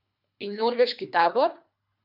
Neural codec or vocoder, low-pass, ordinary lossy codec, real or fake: codec, 24 kHz, 3 kbps, HILCodec; 5.4 kHz; none; fake